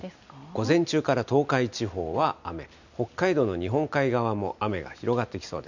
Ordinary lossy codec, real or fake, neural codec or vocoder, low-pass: none; real; none; 7.2 kHz